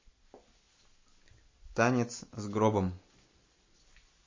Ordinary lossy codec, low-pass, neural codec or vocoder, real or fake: MP3, 32 kbps; 7.2 kHz; none; real